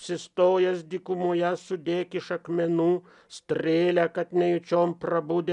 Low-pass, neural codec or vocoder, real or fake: 10.8 kHz; none; real